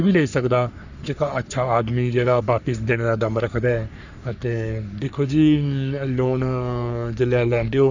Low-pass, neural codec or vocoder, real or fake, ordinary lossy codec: 7.2 kHz; codec, 44.1 kHz, 3.4 kbps, Pupu-Codec; fake; none